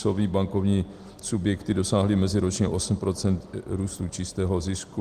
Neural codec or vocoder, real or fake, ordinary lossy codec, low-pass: none; real; Opus, 24 kbps; 14.4 kHz